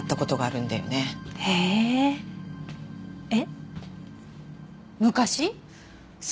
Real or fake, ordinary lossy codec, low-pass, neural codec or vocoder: real; none; none; none